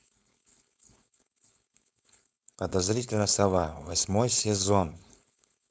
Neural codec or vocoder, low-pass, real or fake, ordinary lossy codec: codec, 16 kHz, 4.8 kbps, FACodec; none; fake; none